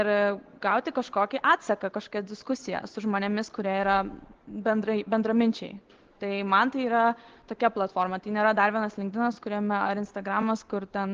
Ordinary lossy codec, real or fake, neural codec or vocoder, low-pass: Opus, 16 kbps; real; none; 7.2 kHz